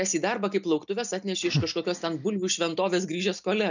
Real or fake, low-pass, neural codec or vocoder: real; 7.2 kHz; none